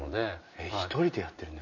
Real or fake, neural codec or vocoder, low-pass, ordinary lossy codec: real; none; 7.2 kHz; none